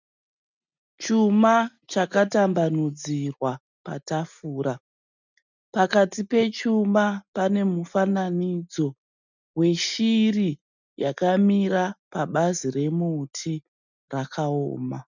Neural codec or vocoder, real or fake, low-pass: none; real; 7.2 kHz